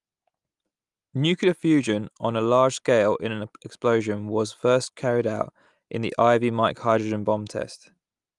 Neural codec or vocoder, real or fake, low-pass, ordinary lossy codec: none; real; 10.8 kHz; Opus, 32 kbps